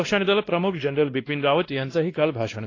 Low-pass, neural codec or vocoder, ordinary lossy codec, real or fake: 7.2 kHz; codec, 16 kHz, 1 kbps, X-Codec, WavLM features, trained on Multilingual LibriSpeech; AAC, 32 kbps; fake